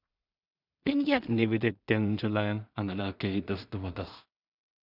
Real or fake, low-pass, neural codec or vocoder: fake; 5.4 kHz; codec, 16 kHz in and 24 kHz out, 0.4 kbps, LongCat-Audio-Codec, two codebook decoder